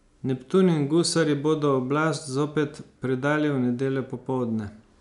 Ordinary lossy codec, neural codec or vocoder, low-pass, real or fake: none; none; 10.8 kHz; real